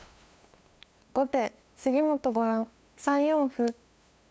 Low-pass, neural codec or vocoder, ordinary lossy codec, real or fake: none; codec, 16 kHz, 2 kbps, FunCodec, trained on LibriTTS, 25 frames a second; none; fake